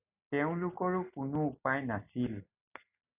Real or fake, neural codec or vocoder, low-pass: real; none; 3.6 kHz